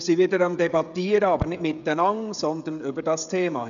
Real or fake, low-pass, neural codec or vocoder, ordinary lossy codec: fake; 7.2 kHz; codec, 16 kHz, 16 kbps, FreqCodec, smaller model; none